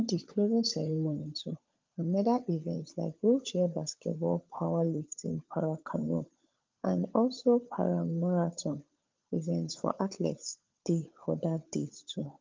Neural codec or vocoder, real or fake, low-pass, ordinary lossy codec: codec, 16 kHz, 16 kbps, FunCodec, trained on LibriTTS, 50 frames a second; fake; 7.2 kHz; Opus, 32 kbps